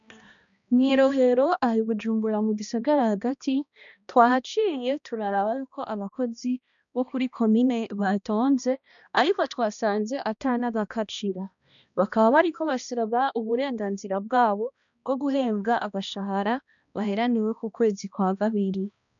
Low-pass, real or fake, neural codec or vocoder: 7.2 kHz; fake; codec, 16 kHz, 1 kbps, X-Codec, HuBERT features, trained on balanced general audio